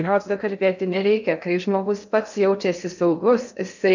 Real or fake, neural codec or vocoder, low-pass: fake; codec, 16 kHz in and 24 kHz out, 0.6 kbps, FocalCodec, streaming, 2048 codes; 7.2 kHz